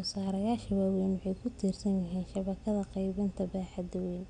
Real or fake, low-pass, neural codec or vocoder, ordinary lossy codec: real; 9.9 kHz; none; none